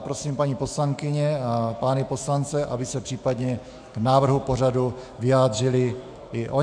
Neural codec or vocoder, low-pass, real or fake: autoencoder, 48 kHz, 128 numbers a frame, DAC-VAE, trained on Japanese speech; 9.9 kHz; fake